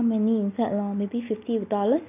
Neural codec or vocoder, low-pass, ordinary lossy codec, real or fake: none; 3.6 kHz; none; real